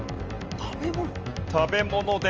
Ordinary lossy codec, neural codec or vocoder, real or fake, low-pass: Opus, 24 kbps; none; real; 7.2 kHz